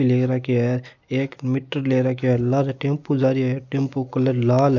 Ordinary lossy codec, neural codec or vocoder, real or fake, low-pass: none; none; real; 7.2 kHz